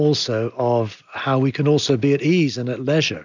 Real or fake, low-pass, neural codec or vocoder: real; 7.2 kHz; none